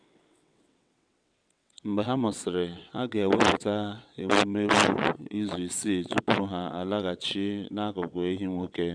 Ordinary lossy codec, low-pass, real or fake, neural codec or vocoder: none; 9.9 kHz; fake; vocoder, 22.05 kHz, 80 mel bands, WaveNeXt